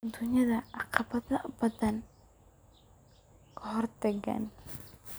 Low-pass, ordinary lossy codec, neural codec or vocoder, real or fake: none; none; none; real